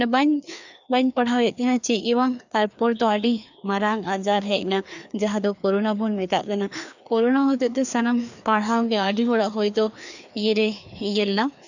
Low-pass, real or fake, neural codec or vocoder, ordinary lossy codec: 7.2 kHz; fake; codec, 16 kHz, 2 kbps, FreqCodec, larger model; none